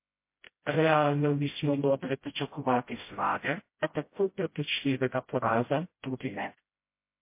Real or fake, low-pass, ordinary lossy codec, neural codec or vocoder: fake; 3.6 kHz; MP3, 24 kbps; codec, 16 kHz, 0.5 kbps, FreqCodec, smaller model